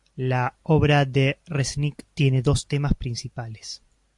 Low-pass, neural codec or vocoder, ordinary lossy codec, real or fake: 10.8 kHz; none; MP3, 64 kbps; real